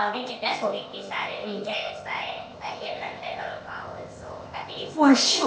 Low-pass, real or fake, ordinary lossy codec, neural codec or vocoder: none; fake; none; codec, 16 kHz, 0.8 kbps, ZipCodec